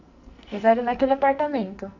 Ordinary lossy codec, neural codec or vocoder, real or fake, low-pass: none; codec, 44.1 kHz, 2.6 kbps, SNAC; fake; 7.2 kHz